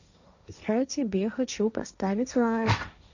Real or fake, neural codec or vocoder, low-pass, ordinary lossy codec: fake; codec, 16 kHz, 1.1 kbps, Voila-Tokenizer; 7.2 kHz; none